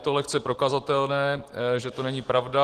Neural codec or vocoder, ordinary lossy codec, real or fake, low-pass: none; Opus, 16 kbps; real; 14.4 kHz